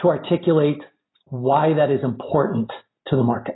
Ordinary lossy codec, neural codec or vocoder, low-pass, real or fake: AAC, 16 kbps; none; 7.2 kHz; real